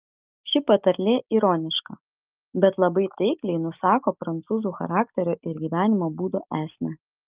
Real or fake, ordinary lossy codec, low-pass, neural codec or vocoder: real; Opus, 24 kbps; 3.6 kHz; none